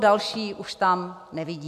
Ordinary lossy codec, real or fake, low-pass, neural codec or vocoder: AAC, 96 kbps; real; 14.4 kHz; none